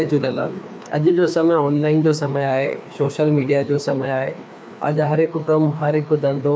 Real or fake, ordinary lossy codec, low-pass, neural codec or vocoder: fake; none; none; codec, 16 kHz, 2 kbps, FreqCodec, larger model